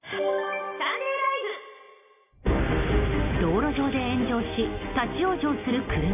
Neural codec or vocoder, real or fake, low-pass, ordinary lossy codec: none; real; 3.6 kHz; none